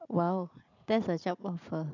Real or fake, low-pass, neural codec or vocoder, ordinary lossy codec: fake; 7.2 kHz; vocoder, 44.1 kHz, 80 mel bands, Vocos; none